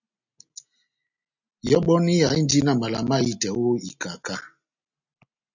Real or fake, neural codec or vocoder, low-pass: real; none; 7.2 kHz